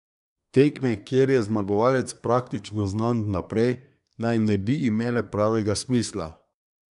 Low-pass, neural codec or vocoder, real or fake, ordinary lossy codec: 10.8 kHz; codec, 24 kHz, 1 kbps, SNAC; fake; none